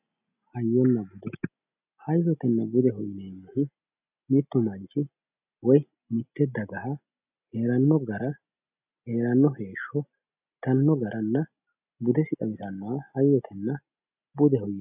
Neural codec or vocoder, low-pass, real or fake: none; 3.6 kHz; real